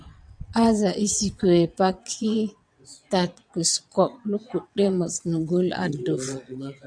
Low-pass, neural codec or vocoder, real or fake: 9.9 kHz; vocoder, 22.05 kHz, 80 mel bands, WaveNeXt; fake